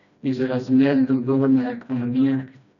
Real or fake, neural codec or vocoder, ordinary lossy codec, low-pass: fake; codec, 16 kHz, 1 kbps, FreqCodec, smaller model; none; 7.2 kHz